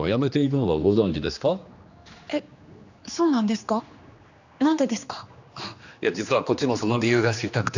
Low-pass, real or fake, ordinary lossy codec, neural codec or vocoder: 7.2 kHz; fake; none; codec, 16 kHz, 2 kbps, X-Codec, HuBERT features, trained on general audio